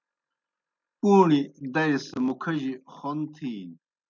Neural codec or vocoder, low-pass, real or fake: none; 7.2 kHz; real